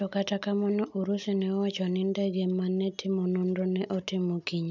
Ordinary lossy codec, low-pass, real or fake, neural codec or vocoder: none; 7.2 kHz; real; none